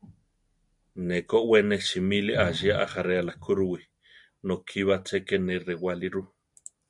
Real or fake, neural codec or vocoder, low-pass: real; none; 10.8 kHz